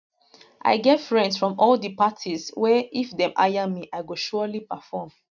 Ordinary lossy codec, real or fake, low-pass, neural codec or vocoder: none; real; 7.2 kHz; none